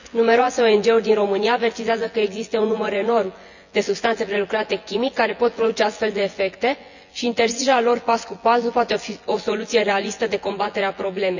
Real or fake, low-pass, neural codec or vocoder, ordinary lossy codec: fake; 7.2 kHz; vocoder, 24 kHz, 100 mel bands, Vocos; none